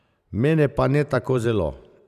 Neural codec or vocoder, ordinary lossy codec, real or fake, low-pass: vocoder, 44.1 kHz, 128 mel bands every 512 samples, BigVGAN v2; none; fake; 14.4 kHz